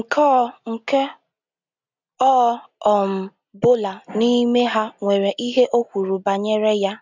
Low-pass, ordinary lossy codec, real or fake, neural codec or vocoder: 7.2 kHz; none; real; none